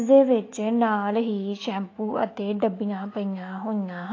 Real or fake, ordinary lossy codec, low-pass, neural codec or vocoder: fake; AAC, 32 kbps; 7.2 kHz; autoencoder, 48 kHz, 128 numbers a frame, DAC-VAE, trained on Japanese speech